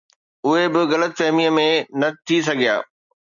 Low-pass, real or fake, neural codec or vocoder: 7.2 kHz; real; none